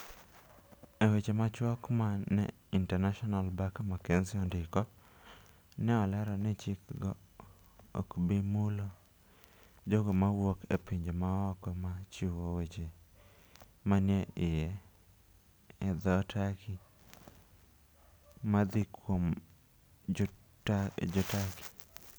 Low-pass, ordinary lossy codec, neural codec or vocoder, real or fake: none; none; none; real